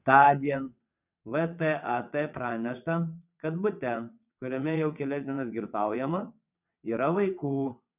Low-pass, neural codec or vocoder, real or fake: 3.6 kHz; vocoder, 22.05 kHz, 80 mel bands, WaveNeXt; fake